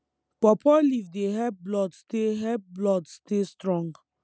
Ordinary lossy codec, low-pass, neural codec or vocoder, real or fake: none; none; none; real